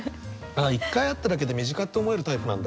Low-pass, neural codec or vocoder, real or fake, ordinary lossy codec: none; none; real; none